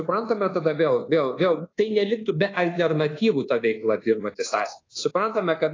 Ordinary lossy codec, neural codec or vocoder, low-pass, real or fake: AAC, 32 kbps; codec, 24 kHz, 1.2 kbps, DualCodec; 7.2 kHz; fake